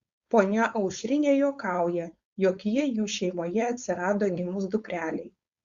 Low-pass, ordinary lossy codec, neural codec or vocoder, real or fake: 7.2 kHz; Opus, 64 kbps; codec, 16 kHz, 4.8 kbps, FACodec; fake